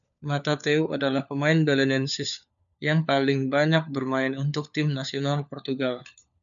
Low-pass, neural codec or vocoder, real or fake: 7.2 kHz; codec, 16 kHz, 4 kbps, FunCodec, trained on LibriTTS, 50 frames a second; fake